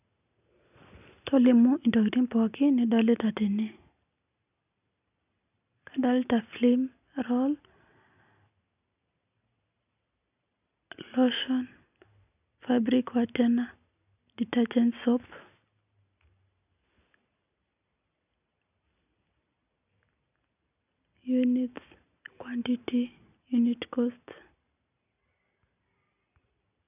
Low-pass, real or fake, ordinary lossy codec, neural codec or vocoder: 3.6 kHz; real; none; none